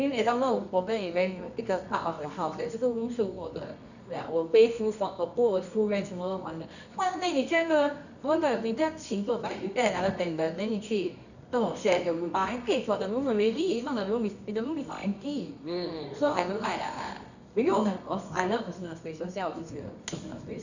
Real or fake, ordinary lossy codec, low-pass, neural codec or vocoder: fake; none; 7.2 kHz; codec, 24 kHz, 0.9 kbps, WavTokenizer, medium music audio release